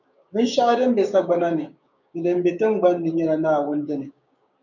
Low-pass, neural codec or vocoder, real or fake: 7.2 kHz; codec, 16 kHz, 6 kbps, DAC; fake